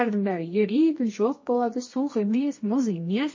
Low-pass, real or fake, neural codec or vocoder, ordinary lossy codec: 7.2 kHz; fake; codec, 24 kHz, 0.9 kbps, WavTokenizer, medium music audio release; MP3, 32 kbps